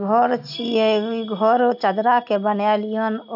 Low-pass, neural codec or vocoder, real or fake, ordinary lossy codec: 5.4 kHz; none; real; AAC, 48 kbps